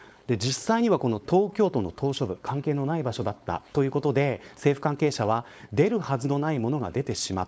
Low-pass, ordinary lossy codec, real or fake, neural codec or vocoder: none; none; fake; codec, 16 kHz, 4.8 kbps, FACodec